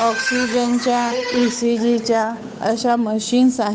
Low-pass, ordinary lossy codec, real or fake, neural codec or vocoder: none; none; fake; codec, 16 kHz, 8 kbps, FunCodec, trained on Chinese and English, 25 frames a second